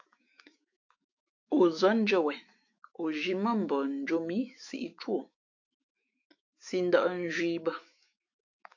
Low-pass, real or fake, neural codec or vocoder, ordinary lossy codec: 7.2 kHz; fake; autoencoder, 48 kHz, 128 numbers a frame, DAC-VAE, trained on Japanese speech; AAC, 48 kbps